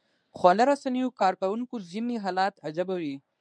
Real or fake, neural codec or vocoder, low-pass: fake; codec, 24 kHz, 0.9 kbps, WavTokenizer, medium speech release version 1; 9.9 kHz